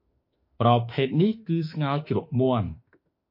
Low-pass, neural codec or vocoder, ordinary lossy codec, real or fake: 5.4 kHz; autoencoder, 48 kHz, 32 numbers a frame, DAC-VAE, trained on Japanese speech; MP3, 32 kbps; fake